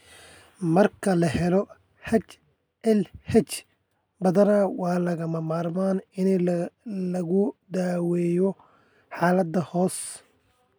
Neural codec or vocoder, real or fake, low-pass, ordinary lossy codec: none; real; none; none